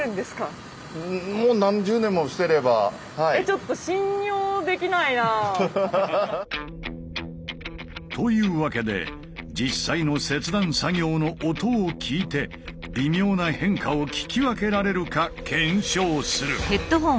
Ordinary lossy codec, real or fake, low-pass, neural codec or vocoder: none; real; none; none